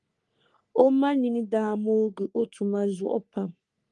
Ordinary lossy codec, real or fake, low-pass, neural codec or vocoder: Opus, 32 kbps; fake; 10.8 kHz; codec, 44.1 kHz, 3.4 kbps, Pupu-Codec